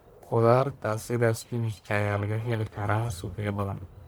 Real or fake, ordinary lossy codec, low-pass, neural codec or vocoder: fake; none; none; codec, 44.1 kHz, 1.7 kbps, Pupu-Codec